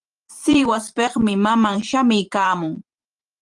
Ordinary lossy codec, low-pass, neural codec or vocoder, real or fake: Opus, 24 kbps; 10.8 kHz; vocoder, 44.1 kHz, 128 mel bands every 512 samples, BigVGAN v2; fake